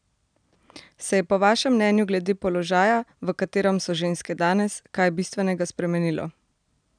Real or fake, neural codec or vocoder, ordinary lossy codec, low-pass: real; none; none; 9.9 kHz